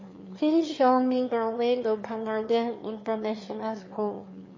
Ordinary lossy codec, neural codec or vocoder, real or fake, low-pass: MP3, 32 kbps; autoencoder, 22.05 kHz, a latent of 192 numbers a frame, VITS, trained on one speaker; fake; 7.2 kHz